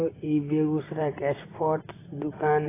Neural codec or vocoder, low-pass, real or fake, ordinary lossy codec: none; 3.6 kHz; real; AAC, 16 kbps